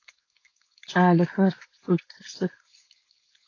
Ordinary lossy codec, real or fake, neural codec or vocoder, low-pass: AAC, 32 kbps; fake; codec, 16 kHz, 4.8 kbps, FACodec; 7.2 kHz